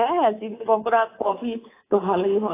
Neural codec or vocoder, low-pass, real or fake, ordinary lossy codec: codec, 24 kHz, 3.1 kbps, DualCodec; 3.6 kHz; fake; AAC, 16 kbps